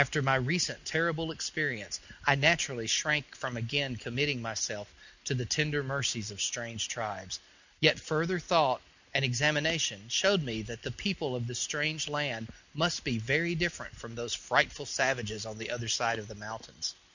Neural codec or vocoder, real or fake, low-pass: none; real; 7.2 kHz